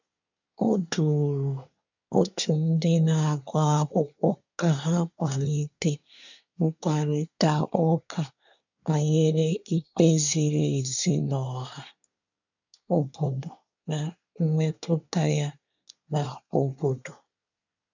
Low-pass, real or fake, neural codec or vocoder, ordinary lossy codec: 7.2 kHz; fake; codec, 24 kHz, 1 kbps, SNAC; none